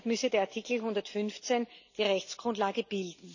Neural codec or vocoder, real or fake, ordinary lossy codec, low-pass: none; real; none; 7.2 kHz